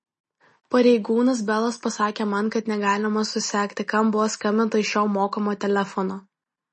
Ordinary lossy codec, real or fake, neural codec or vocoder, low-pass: MP3, 32 kbps; real; none; 10.8 kHz